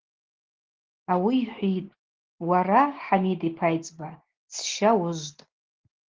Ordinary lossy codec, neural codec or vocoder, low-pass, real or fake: Opus, 16 kbps; none; 7.2 kHz; real